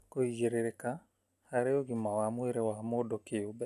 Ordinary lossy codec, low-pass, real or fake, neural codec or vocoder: none; 14.4 kHz; real; none